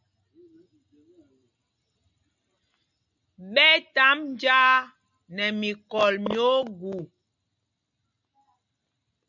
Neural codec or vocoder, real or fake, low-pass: none; real; 7.2 kHz